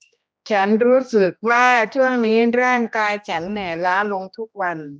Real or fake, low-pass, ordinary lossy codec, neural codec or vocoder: fake; none; none; codec, 16 kHz, 1 kbps, X-Codec, HuBERT features, trained on general audio